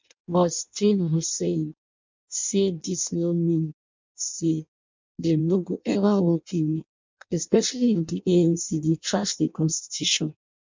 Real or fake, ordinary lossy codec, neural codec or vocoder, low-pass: fake; MP3, 64 kbps; codec, 16 kHz in and 24 kHz out, 0.6 kbps, FireRedTTS-2 codec; 7.2 kHz